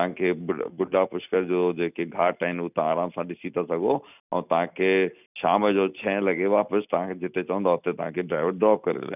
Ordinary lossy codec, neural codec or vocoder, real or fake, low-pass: none; none; real; 3.6 kHz